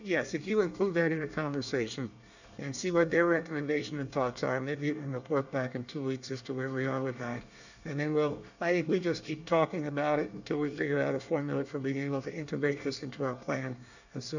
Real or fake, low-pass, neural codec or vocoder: fake; 7.2 kHz; codec, 24 kHz, 1 kbps, SNAC